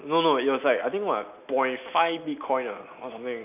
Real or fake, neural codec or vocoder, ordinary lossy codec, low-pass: real; none; none; 3.6 kHz